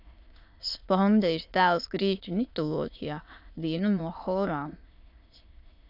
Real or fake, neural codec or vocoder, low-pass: fake; autoencoder, 22.05 kHz, a latent of 192 numbers a frame, VITS, trained on many speakers; 5.4 kHz